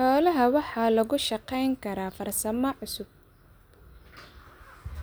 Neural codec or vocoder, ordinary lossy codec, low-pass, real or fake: none; none; none; real